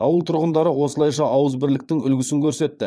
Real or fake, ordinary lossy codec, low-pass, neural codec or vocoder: fake; none; none; vocoder, 22.05 kHz, 80 mel bands, Vocos